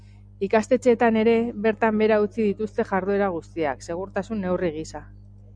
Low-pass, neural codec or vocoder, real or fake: 9.9 kHz; none; real